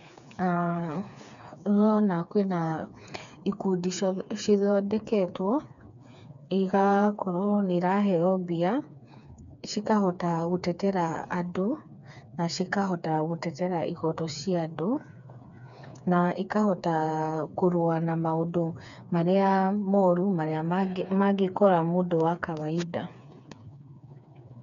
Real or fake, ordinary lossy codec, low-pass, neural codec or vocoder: fake; none; 7.2 kHz; codec, 16 kHz, 4 kbps, FreqCodec, smaller model